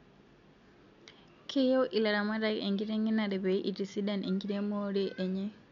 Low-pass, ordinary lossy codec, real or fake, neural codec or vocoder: 7.2 kHz; none; real; none